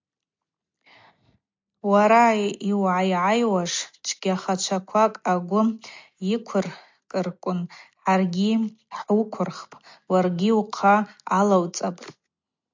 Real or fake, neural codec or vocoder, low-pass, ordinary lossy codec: real; none; 7.2 kHz; MP3, 64 kbps